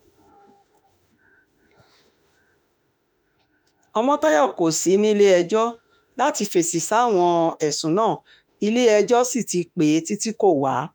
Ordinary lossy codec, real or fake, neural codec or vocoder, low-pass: none; fake; autoencoder, 48 kHz, 32 numbers a frame, DAC-VAE, trained on Japanese speech; none